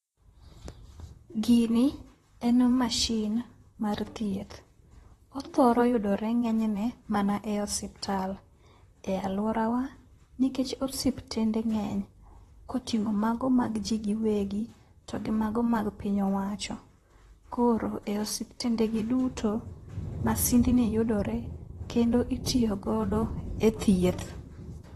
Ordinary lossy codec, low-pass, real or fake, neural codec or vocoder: AAC, 32 kbps; 19.8 kHz; fake; vocoder, 44.1 kHz, 128 mel bands, Pupu-Vocoder